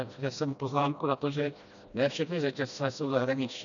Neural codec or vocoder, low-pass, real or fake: codec, 16 kHz, 1 kbps, FreqCodec, smaller model; 7.2 kHz; fake